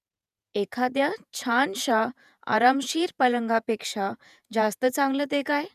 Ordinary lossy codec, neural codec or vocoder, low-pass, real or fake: none; vocoder, 48 kHz, 128 mel bands, Vocos; 14.4 kHz; fake